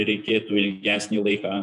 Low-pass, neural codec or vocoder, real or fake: 10.8 kHz; vocoder, 48 kHz, 128 mel bands, Vocos; fake